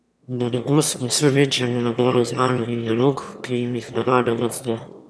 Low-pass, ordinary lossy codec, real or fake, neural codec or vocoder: none; none; fake; autoencoder, 22.05 kHz, a latent of 192 numbers a frame, VITS, trained on one speaker